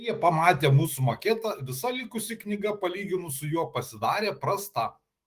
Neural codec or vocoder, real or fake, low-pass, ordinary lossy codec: autoencoder, 48 kHz, 128 numbers a frame, DAC-VAE, trained on Japanese speech; fake; 14.4 kHz; Opus, 24 kbps